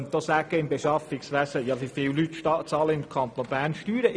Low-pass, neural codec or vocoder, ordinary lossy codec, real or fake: none; none; none; real